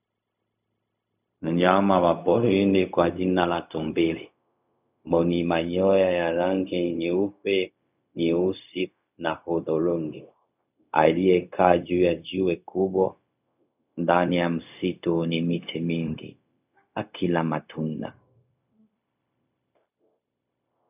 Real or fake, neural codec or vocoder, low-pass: fake; codec, 16 kHz, 0.4 kbps, LongCat-Audio-Codec; 3.6 kHz